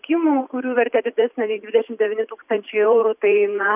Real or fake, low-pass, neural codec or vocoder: fake; 3.6 kHz; vocoder, 44.1 kHz, 128 mel bands every 512 samples, BigVGAN v2